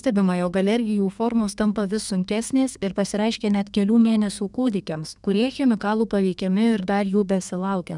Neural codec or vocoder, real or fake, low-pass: codec, 32 kHz, 1.9 kbps, SNAC; fake; 10.8 kHz